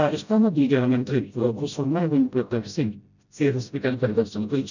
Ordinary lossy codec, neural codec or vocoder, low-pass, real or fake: none; codec, 16 kHz, 0.5 kbps, FreqCodec, smaller model; 7.2 kHz; fake